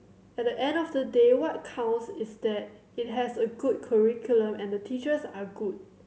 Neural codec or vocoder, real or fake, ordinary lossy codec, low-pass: none; real; none; none